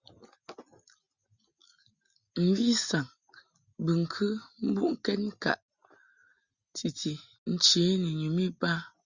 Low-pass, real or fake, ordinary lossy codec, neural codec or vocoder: 7.2 kHz; real; Opus, 64 kbps; none